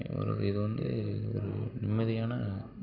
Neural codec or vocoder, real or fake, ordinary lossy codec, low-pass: none; real; Opus, 64 kbps; 5.4 kHz